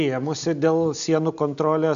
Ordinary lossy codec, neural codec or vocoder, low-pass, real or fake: AAC, 96 kbps; none; 7.2 kHz; real